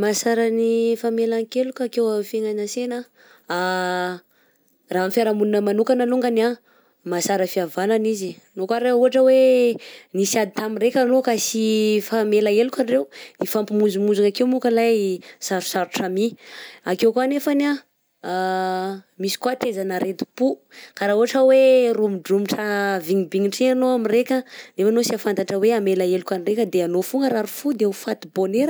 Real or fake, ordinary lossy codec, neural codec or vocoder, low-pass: real; none; none; none